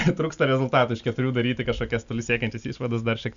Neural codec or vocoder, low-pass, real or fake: none; 7.2 kHz; real